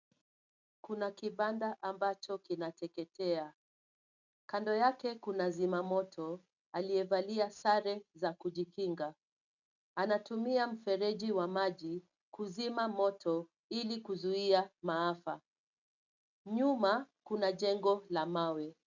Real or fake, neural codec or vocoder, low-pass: real; none; 7.2 kHz